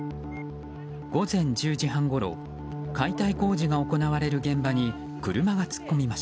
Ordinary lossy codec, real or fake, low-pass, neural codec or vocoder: none; real; none; none